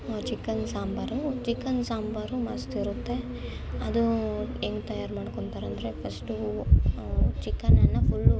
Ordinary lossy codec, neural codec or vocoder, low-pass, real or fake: none; none; none; real